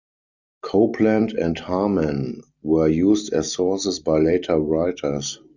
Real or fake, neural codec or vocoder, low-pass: real; none; 7.2 kHz